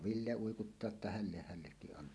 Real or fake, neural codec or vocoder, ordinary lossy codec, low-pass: real; none; none; none